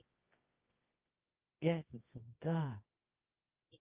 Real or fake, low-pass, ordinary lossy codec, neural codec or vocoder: fake; 3.6 kHz; Opus, 32 kbps; codec, 24 kHz, 0.9 kbps, WavTokenizer, medium music audio release